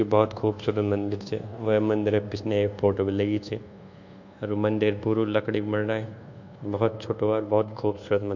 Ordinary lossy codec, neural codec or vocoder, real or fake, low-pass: none; codec, 24 kHz, 1.2 kbps, DualCodec; fake; 7.2 kHz